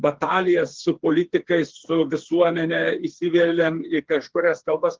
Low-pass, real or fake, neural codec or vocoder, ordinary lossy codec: 7.2 kHz; fake; codec, 16 kHz, 4 kbps, FreqCodec, smaller model; Opus, 16 kbps